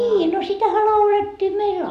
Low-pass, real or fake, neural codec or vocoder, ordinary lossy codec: 14.4 kHz; real; none; none